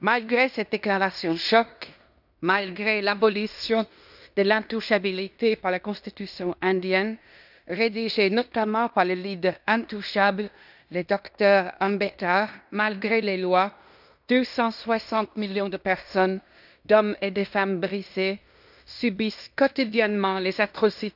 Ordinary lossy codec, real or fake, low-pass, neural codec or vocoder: none; fake; 5.4 kHz; codec, 16 kHz in and 24 kHz out, 0.9 kbps, LongCat-Audio-Codec, fine tuned four codebook decoder